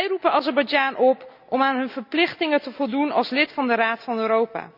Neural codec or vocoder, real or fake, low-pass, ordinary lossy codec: none; real; 5.4 kHz; none